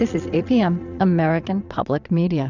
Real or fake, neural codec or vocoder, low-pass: fake; vocoder, 22.05 kHz, 80 mel bands, Vocos; 7.2 kHz